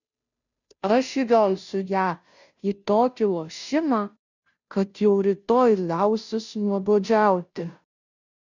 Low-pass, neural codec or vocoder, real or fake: 7.2 kHz; codec, 16 kHz, 0.5 kbps, FunCodec, trained on Chinese and English, 25 frames a second; fake